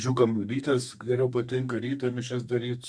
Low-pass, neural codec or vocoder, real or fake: 9.9 kHz; codec, 32 kHz, 1.9 kbps, SNAC; fake